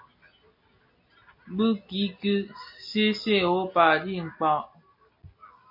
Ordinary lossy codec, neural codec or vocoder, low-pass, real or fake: MP3, 32 kbps; none; 5.4 kHz; real